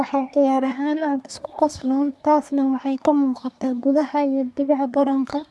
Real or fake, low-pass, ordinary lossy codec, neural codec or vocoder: fake; none; none; codec, 24 kHz, 1 kbps, SNAC